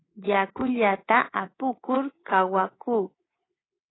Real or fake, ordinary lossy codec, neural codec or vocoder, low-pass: fake; AAC, 16 kbps; codec, 24 kHz, 3.1 kbps, DualCodec; 7.2 kHz